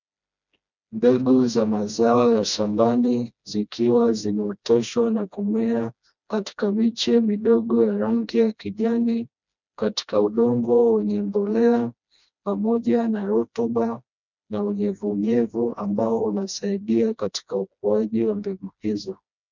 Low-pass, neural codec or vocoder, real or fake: 7.2 kHz; codec, 16 kHz, 1 kbps, FreqCodec, smaller model; fake